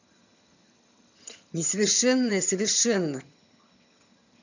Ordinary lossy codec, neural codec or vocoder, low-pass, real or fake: none; vocoder, 22.05 kHz, 80 mel bands, HiFi-GAN; 7.2 kHz; fake